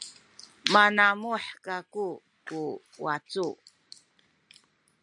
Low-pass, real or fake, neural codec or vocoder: 10.8 kHz; real; none